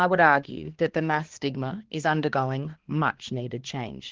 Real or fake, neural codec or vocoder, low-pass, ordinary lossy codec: fake; codec, 16 kHz, 2 kbps, X-Codec, HuBERT features, trained on balanced general audio; 7.2 kHz; Opus, 16 kbps